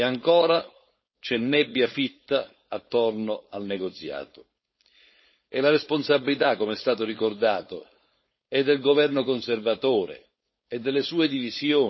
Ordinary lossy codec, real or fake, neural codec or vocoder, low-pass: MP3, 24 kbps; fake; codec, 16 kHz, 4.8 kbps, FACodec; 7.2 kHz